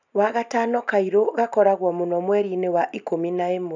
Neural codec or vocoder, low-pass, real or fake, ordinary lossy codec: none; 7.2 kHz; real; none